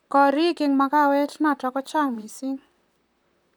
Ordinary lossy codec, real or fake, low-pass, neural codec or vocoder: none; fake; none; vocoder, 44.1 kHz, 128 mel bands, Pupu-Vocoder